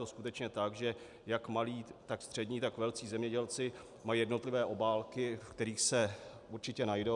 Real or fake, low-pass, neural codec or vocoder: real; 10.8 kHz; none